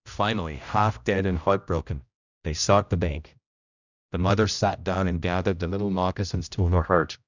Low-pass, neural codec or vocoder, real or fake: 7.2 kHz; codec, 16 kHz, 0.5 kbps, X-Codec, HuBERT features, trained on general audio; fake